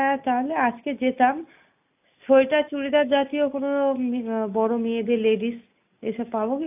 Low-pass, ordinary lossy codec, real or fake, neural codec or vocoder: 3.6 kHz; AAC, 32 kbps; real; none